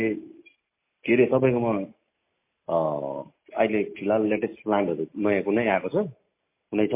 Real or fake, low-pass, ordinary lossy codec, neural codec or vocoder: real; 3.6 kHz; MP3, 24 kbps; none